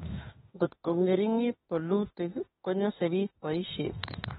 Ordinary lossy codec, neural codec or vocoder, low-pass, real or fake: AAC, 16 kbps; autoencoder, 48 kHz, 32 numbers a frame, DAC-VAE, trained on Japanese speech; 19.8 kHz; fake